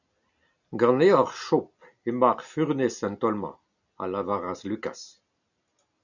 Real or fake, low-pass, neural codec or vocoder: real; 7.2 kHz; none